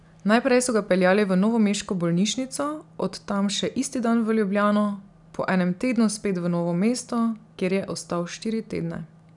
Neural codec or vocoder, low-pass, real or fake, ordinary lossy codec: none; 10.8 kHz; real; none